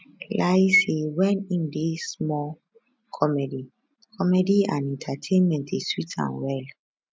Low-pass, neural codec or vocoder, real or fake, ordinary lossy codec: none; none; real; none